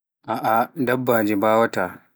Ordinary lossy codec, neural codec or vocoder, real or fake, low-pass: none; none; real; none